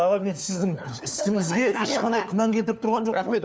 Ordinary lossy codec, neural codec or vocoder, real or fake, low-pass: none; codec, 16 kHz, 4 kbps, FunCodec, trained on LibriTTS, 50 frames a second; fake; none